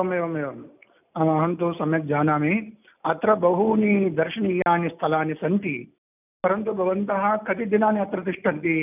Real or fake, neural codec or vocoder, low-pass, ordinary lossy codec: real; none; 3.6 kHz; none